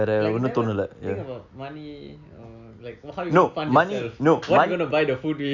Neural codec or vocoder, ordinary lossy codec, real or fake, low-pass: none; Opus, 64 kbps; real; 7.2 kHz